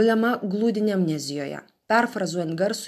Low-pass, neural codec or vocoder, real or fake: 14.4 kHz; none; real